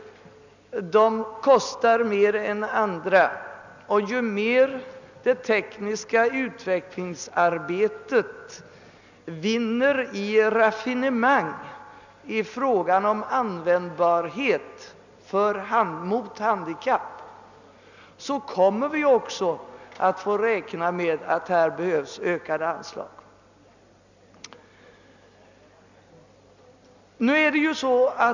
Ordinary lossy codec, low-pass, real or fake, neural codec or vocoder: none; 7.2 kHz; real; none